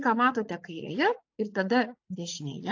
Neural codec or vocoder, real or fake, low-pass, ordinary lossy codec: vocoder, 44.1 kHz, 80 mel bands, Vocos; fake; 7.2 kHz; AAC, 48 kbps